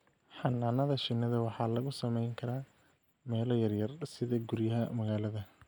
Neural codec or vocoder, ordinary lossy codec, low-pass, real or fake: none; none; none; real